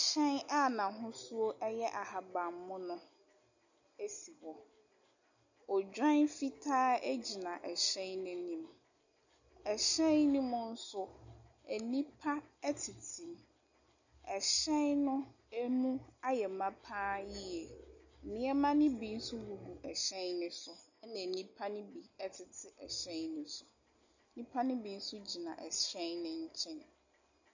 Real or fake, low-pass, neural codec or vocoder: real; 7.2 kHz; none